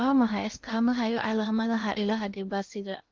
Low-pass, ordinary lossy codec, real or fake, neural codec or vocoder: 7.2 kHz; Opus, 32 kbps; fake; codec, 16 kHz in and 24 kHz out, 0.8 kbps, FocalCodec, streaming, 65536 codes